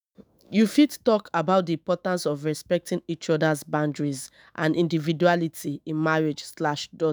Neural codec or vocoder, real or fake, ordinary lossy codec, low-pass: autoencoder, 48 kHz, 128 numbers a frame, DAC-VAE, trained on Japanese speech; fake; none; none